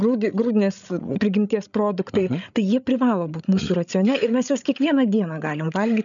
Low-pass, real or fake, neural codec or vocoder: 7.2 kHz; fake; codec, 16 kHz, 8 kbps, FreqCodec, larger model